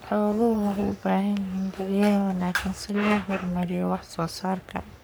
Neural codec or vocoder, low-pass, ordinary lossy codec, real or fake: codec, 44.1 kHz, 3.4 kbps, Pupu-Codec; none; none; fake